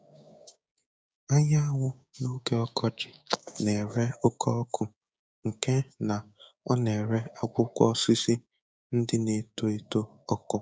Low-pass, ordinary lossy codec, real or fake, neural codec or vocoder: none; none; fake; codec, 16 kHz, 6 kbps, DAC